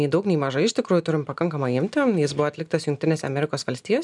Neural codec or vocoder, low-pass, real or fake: none; 10.8 kHz; real